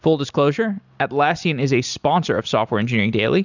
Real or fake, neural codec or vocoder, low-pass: real; none; 7.2 kHz